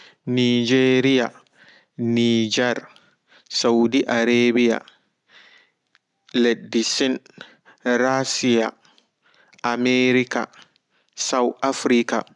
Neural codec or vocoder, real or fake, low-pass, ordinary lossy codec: none; real; 10.8 kHz; none